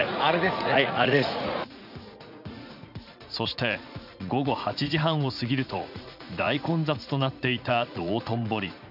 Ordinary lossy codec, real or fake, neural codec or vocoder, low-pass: none; fake; vocoder, 44.1 kHz, 80 mel bands, Vocos; 5.4 kHz